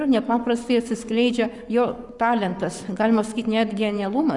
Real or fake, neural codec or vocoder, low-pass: fake; codec, 44.1 kHz, 7.8 kbps, Pupu-Codec; 10.8 kHz